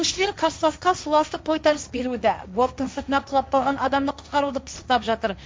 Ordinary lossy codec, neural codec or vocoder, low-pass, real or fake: none; codec, 16 kHz, 1.1 kbps, Voila-Tokenizer; none; fake